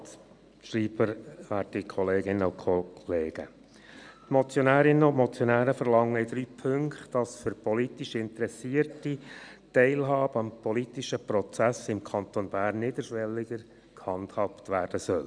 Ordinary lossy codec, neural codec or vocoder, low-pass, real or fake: none; none; 9.9 kHz; real